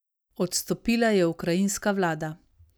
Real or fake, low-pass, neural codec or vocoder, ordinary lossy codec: real; none; none; none